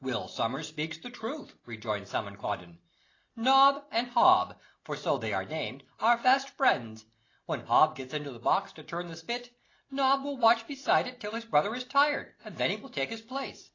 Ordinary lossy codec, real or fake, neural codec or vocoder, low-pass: AAC, 32 kbps; real; none; 7.2 kHz